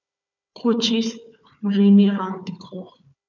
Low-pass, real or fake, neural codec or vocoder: 7.2 kHz; fake; codec, 16 kHz, 4 kbps, FunCodec, trained on Chinese and English, 50 frames a second